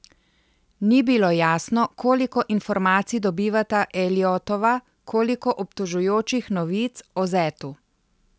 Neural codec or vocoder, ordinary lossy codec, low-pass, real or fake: none; none; none; real